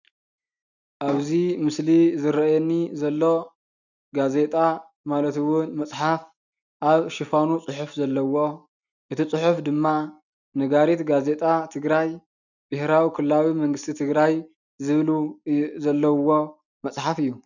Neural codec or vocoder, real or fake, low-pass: none; real; 7.2 kHz